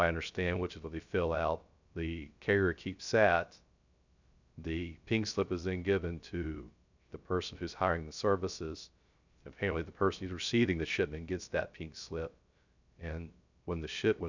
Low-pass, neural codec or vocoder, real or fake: 7.2 kHz; codec, 16 kHz, 0.3 kbps, FocalCodec; fake